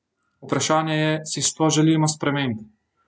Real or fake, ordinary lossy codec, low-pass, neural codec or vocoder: real; none; none; none